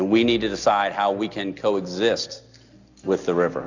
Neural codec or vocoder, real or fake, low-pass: none; real; 7.2 kHz